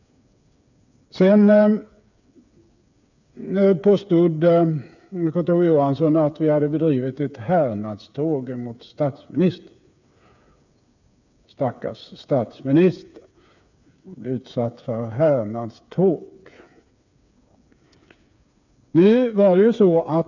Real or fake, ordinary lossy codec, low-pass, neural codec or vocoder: fake; none; 7.2 kHz; codec, 16 kHz, 8 kbps, FreqCodec, smaller model